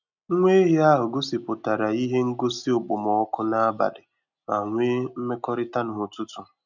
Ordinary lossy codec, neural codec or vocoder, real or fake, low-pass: none; none; real; 7.2 kHz